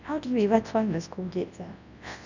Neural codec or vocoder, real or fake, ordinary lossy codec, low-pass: codec, 24 kHz, 0.9 kbps, WavTokenizer, large speech release; fake; none; 7.2 kHz